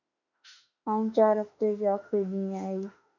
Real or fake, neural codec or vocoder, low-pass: fake; autoencoder, 48 kHz, 32 numbers a frame, DAC-VAE, trained on Japanese speech; 7.2 kHz